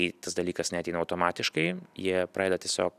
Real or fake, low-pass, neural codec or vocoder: real; 14.4 kHz; none